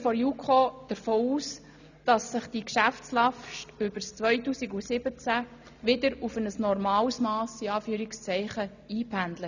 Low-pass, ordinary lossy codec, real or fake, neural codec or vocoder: 7.2 kHz; none; real; none